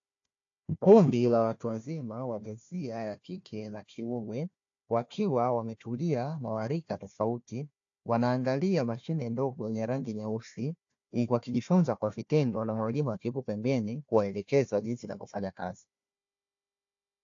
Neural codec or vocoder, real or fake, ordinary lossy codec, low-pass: codec, 16 kHz, 1 kbps, FunCodec, trained on Chinese and English, 50 frames a second; fake; AAC, 48 kbps; 7.2 kHz